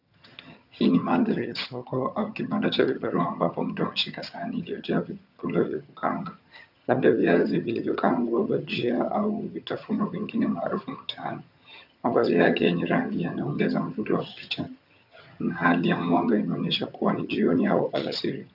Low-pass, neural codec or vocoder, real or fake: 5.4 kHz; vocoder, 22.05 kHz, 80 mel bands, HiFi-GAN; fake